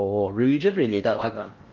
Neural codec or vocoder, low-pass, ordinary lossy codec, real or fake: codec, 16 kHz in and 24 kHz out, 0.6 kbps, FocalCodec, streaming, 4096 codes; 7.2 kHz; Opus, 32 kbps; fake